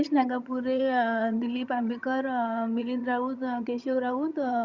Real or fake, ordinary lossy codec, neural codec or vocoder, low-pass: fake; Opus, 64 kbps; vocoder, 22.05 kHz, 80 mel bands, HiFi-GAN; 7.2 kHz